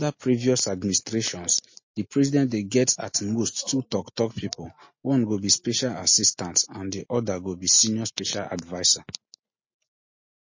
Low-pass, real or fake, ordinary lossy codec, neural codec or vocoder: 7.2 kHz; real; MP3, 32 kbps; none